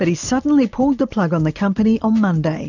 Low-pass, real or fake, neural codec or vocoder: 7.2 kHz; real; none